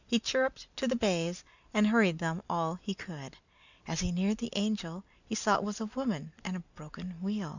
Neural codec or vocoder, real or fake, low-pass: none; real; 7.2 kHz